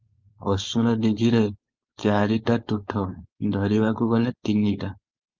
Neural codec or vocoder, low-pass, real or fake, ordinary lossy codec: codec, 16 kHz, 4.8 kbps, FACodec; 7.2 kHz; fake; Opus, 24 kbps